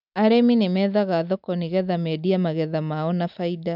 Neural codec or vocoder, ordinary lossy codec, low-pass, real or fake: none; none; 5.4 kHz; real